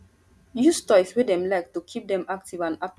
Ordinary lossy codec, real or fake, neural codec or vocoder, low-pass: none; real; none; none